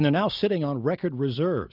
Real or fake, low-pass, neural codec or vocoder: real; 5.4 kHz; none